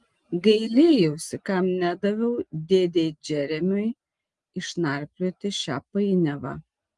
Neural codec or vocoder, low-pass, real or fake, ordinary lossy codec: none; 10.8 kHz; real; Opus, 24 kbps